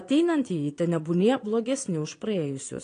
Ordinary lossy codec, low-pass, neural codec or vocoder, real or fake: AAC, 48 kbps; 9.9 kHz; vocoder, 22.05 kHz, 80 mel bands, Vocos; fake